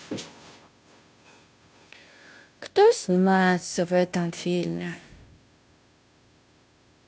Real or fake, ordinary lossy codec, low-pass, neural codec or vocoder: fake; none; none; codec, 16 kHz, 0.5 kbps, FunCodec, trained on Chinese and English, 25 frames a second